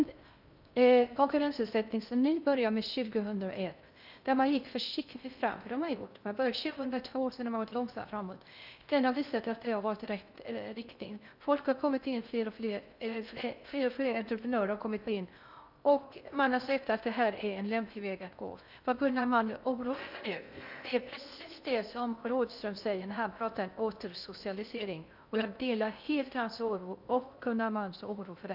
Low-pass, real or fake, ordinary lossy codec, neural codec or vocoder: 5.4 kHz; fake; none; codec, 16 kHz in and 24 kHz out, 0.6 kbps, FocalCodec, streaming, 2048 codes